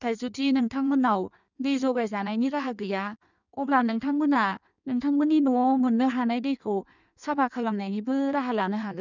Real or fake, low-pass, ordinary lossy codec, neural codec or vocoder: fake; 7.2 kHz; none; codec, 16 kHz in and 24 kHz out, 1.1 kbps, FireRedTTS-2 codec